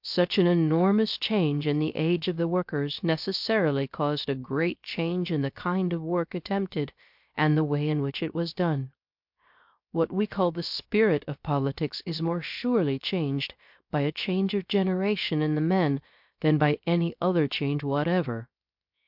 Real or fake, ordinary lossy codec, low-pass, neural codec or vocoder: fake; MP3, 48 kbps; 5.4 kHz; codec, 16 kHz, 0.7 kbps, FocalCodec